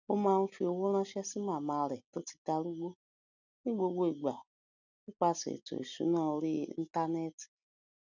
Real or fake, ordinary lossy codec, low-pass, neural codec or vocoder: real; none; 7.2 kHz; none